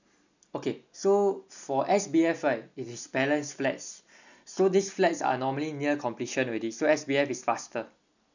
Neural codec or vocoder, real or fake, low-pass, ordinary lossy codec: none; real; 7.2 kHz; none